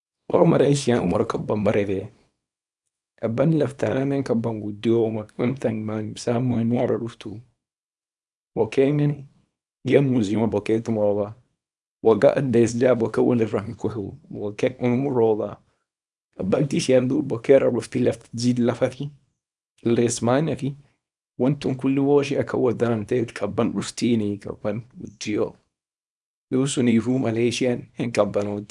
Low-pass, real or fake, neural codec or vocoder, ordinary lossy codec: 10.8 kHz; fake; codec, 24 kHz, 0.9 kbps, WavTokenizer, small release; none